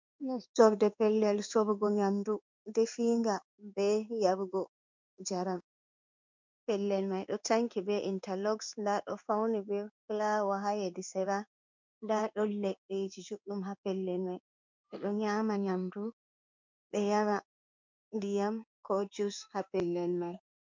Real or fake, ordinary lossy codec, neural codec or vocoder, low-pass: fake; MP3, 64 kbps; codec, 16 kHz in and 24 kHz out, 1 kbps, XY-Tokenizer; 7.2 kHz